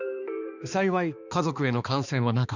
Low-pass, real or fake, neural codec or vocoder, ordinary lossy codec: 7.2 kHz; fake; codec, 16 kHz, 2 kbps, X-Codec, HuBERT features, trained on balanced general audio; none